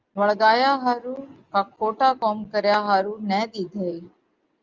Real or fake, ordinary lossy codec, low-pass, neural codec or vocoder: real; Opus, 32 kbps; 7.2 kHz; none